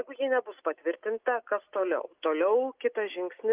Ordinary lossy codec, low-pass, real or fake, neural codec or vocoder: Opus, 24 kbps; 3.6 kHz; real; none